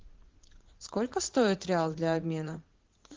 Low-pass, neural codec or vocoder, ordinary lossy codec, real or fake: 7.2 kHz; vocoder, 44.1 kHz, 80 mel bands, Vocos; Opus, 16 kbps; fake